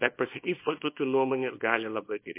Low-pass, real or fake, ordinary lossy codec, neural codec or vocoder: 3.6 kHz; fake; MP3, 24 kbps; codec, 24 kHz, 0.9 kbps, WavTokenizer, small release